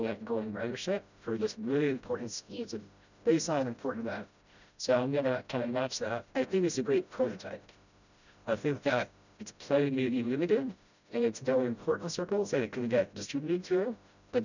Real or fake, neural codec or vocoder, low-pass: fake; codec, 16 kHz, 0.5 kbps, FreqCodec, smaller model; 7.2 kHz